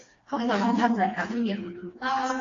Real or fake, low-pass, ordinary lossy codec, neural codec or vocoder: fake; 7.2 kHz; AAC, 32 kbps; codec, 16 kHz, 2 kbps, FreqCodec, smaller model